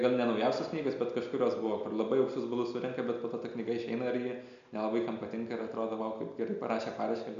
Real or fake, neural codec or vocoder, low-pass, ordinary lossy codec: real; none; 7.2 kHz; MP3, 64 kbps